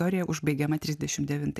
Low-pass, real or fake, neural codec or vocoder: 14.4 kHz; real; none